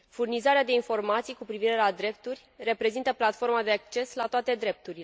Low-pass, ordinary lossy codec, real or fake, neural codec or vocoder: none; none; real; none